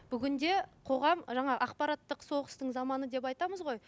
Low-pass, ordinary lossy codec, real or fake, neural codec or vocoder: none; none; real; none